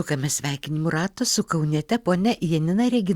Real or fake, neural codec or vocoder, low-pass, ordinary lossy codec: real; none; 19.8 kHz; Opus, 64 kbps